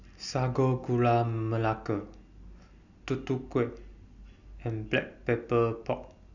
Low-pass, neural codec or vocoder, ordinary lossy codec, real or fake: 7.2 kHz; none; none; real